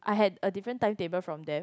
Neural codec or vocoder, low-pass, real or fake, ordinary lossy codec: none; none; real; none